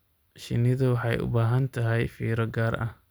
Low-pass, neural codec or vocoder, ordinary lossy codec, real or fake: none; none; none; real